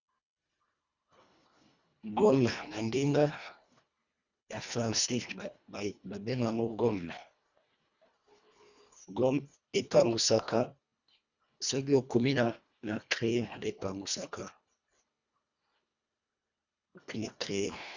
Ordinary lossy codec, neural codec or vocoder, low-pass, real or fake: Opus, 64 kbps; codec, 24 kHz, 1.5 kbps, HILCodec; 7.2 kHz; fake